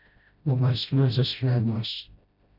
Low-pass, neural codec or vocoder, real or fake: 5.4 kHz; codec, 16 kHz, 0.5 kbps, FreqCodec, smaller model; fake